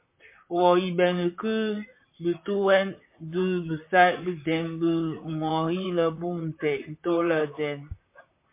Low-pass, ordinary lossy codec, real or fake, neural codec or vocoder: 3.6 kHz; MP3, 24 kbps; fake; vocoder, 44.1 kHz, 128 mel bands, Pupu-Vocoder